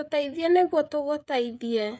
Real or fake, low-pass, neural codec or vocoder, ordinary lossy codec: fake; none; codec, 16 kHz, 16 kbps, FreqCodec, smaller model; none